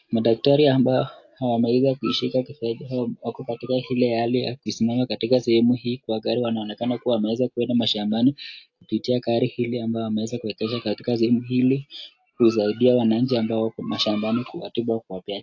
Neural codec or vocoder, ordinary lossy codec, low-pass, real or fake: none; AAC, 48 kbps; 7.2 kHz; real